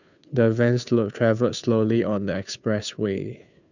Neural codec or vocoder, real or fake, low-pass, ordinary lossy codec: codec, 16 kHz, 2 kbps, FunCodec, trained on Chinese and English, 25 frames a second; fake; 7.2 kHz; none